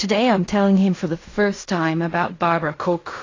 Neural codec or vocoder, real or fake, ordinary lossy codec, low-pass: codec, 16 kHz in and 24 kHz out, 0.4 kbps, LongCat-Audio-Codec, fine tuned four codebook decoder; fake; AAC, 32 kbps; 7.2 kHz